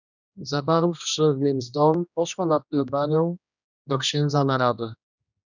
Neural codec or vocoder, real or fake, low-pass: codec, 16 kHz, 1 kbps, X-Codec, HuBERT features, trained on general audio; fake; 7.2 kHz